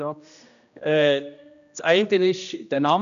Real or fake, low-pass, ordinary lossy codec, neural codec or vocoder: fake; 7.2 kHz; none; codec, 16 kHz, 1 kbps, X-Codec, HuBERT features, trained on general audio